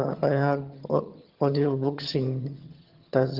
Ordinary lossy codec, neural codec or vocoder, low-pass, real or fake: Opus, 16 kbps; vocoder, 22.05 kHz, 80 mel bands, HiFi-GAN; 5.4 kHz; fake